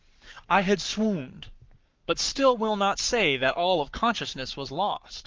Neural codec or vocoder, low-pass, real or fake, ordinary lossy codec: codec, 44.1 kHz, 7.8 kbps, Pupu-Codec; 7.2 kHz; fake; Opus, 24 kbps